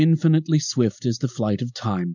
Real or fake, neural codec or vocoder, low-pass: real; none; 7.2 kHz